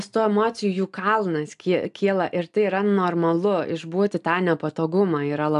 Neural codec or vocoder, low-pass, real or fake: none; 10.8 kHz; real